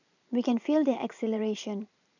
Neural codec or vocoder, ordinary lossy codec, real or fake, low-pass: vocoder, 44.1 kHz, 128 mel bands every 512 samples, BigVGAN v2; none; fake; 7.2 kHz